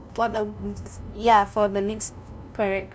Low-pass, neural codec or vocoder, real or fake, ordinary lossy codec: none; codec, 16 kHz, 0.5 kbps, FunCodec, trained on LibriTTS, 25 frames a second; fake; none